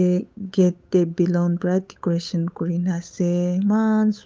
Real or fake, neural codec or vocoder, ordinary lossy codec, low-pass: fake; codec, 16 kHz, 8 kbps, FunCodec, trained on Chinese and English, 25 frames a second; none; none